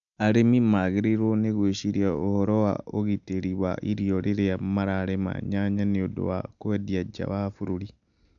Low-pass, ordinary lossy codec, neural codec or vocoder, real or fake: 7.2 kHz; none; none; real